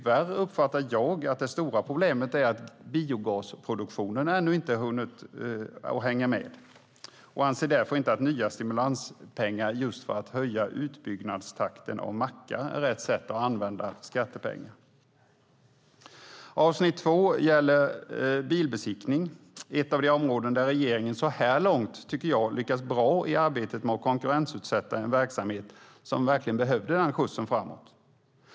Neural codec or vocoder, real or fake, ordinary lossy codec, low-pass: none; real; none; none